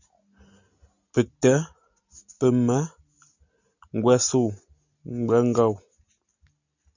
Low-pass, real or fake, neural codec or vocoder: 7.2 kHz; real; none